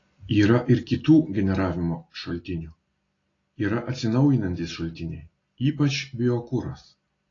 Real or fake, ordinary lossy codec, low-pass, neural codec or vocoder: real; AAC, 32 kbps; 7.2 kHz; none